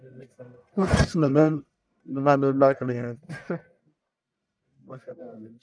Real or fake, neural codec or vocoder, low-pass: fake; codec, 44.1 kHz, 1.7 kbps, Pupu-Codec; 9.9 kHz